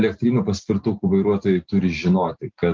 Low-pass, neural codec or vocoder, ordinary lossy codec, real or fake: 7.2 kHz; none; Opus, 24 kbps; real